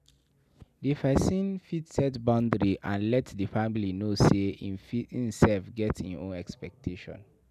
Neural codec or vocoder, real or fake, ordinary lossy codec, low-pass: none; real; none; 14.4 kHz